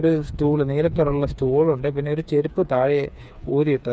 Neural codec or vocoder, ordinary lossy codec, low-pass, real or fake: codec, 16 kHz, 4 kbps, FreqCodec, smaller model; none; none; fake